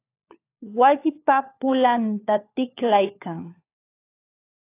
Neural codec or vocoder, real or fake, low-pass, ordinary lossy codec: codec, 16 kHz, 4 kbps, FunCodec, trained on LibriTTS, 50 frames a second; fake; 3.6 kHz; AAC, 24 kbps